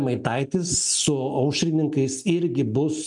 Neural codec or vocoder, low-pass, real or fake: none; 10.8 kHz; real